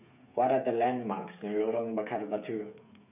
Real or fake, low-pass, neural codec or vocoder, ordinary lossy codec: fake; 3.6 kHz; codec, 16 kHz, 8 kbps, FreqCodec, smaller model; none